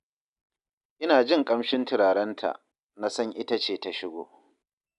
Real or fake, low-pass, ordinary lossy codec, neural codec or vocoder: real; 10.8 kHz; none; none